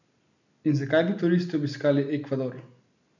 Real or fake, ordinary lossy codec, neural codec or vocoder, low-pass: real; none; none; 7.2 kHz